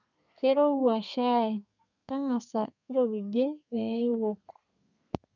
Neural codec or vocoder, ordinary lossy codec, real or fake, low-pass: codec, 32 kHz, 1.9 kbps, SNAC; none; fake; 7.2 kHz